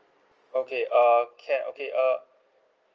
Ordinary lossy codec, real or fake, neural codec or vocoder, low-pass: Opus, 24 kbps; real; none; 7.2 kHz